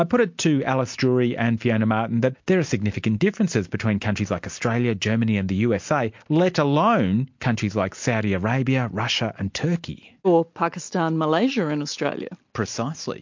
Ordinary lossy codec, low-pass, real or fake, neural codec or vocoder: MP3, 48 kbps; 7.2 kHz; real; none